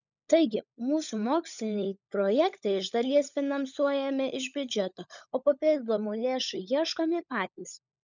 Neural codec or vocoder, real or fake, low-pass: codec, 16 kHz, 16 kbps, FunCodec, trained on LibriTTS, 50 frames a second; fake; 7.2 kHz